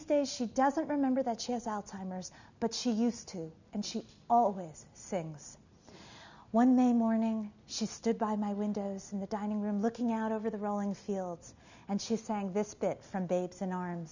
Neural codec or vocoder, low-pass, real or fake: none; 7.2 kHz; real